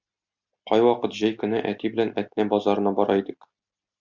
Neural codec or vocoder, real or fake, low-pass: none; real; 7.2 kHz